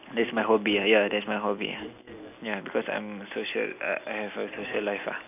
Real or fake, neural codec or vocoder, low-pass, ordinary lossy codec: real; none; 3.6 kHz; none